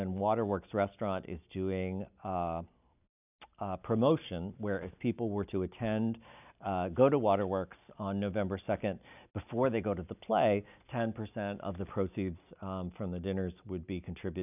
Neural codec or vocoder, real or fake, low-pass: none; real; 3.6 kHz